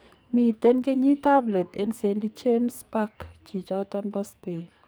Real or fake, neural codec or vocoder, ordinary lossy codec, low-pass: fake; codec, 44.1 kHz, 2.6 kbps, SNAC; none; none